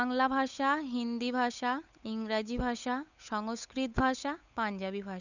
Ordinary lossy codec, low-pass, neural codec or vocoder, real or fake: none; 7.2 kHz; codec, 16 kHz, 8 kbps, FunCodec, trained on Chinese and English, 25 frames a second; fake